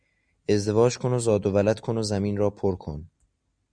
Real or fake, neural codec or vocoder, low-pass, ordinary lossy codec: real; none; 9.9 kHz; MP3, 96 kbps